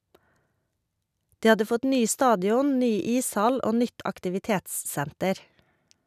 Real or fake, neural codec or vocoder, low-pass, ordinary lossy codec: real; none; 14.4 kHz; none